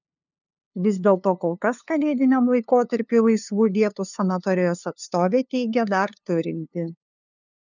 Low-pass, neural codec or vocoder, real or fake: 7.2 kHz; codec, 16 kHz, 2 kbps, FunCodec, trained on LibriTTS, 25 frames a second; fake